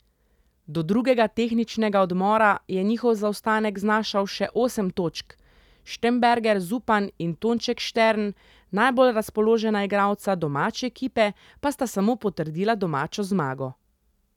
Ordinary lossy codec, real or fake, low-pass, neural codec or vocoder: none; real; 19.8 kHz; none